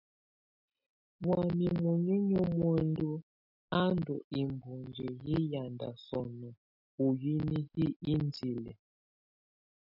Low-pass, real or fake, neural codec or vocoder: 5.4 kHz; real; none